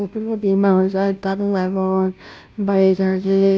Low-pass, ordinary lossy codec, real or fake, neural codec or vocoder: none; none; fake; codec, 16 kHz, 0.5 kbps, FunCodec, trained on Chinese and English, 25 frames a second